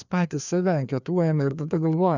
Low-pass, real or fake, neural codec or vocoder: 7.2 kHz; fake; codec, 16 kHz, 2 kbps, FreqCodec, larger model